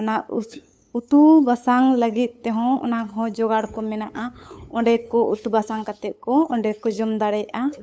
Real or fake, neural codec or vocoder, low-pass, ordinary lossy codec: fake; codec, 16 kHz, 8 kbps, FunCodec, trained on LibriTTS, 25 frames a second; none; none